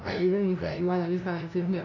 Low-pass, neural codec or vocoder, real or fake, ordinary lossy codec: 7.2 kHz; codec, 16 kHz, 0.5 kbps, FunCodec, trained on LibriTTS, 25 frames a second; fake; none